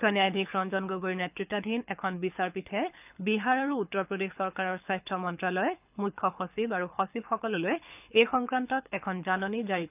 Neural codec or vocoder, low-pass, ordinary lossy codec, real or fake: codec, 24 kHz, 6 kbps, HILCodec; 3.6 kHz; none; fake